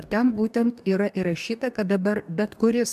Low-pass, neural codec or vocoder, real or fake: 14.4 kHz; codec, 44.1 kHz, 2.6 kbps, DAC; fake